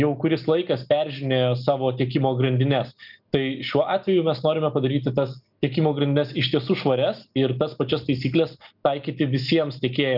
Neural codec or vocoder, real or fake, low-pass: none; real; 5.4 kHz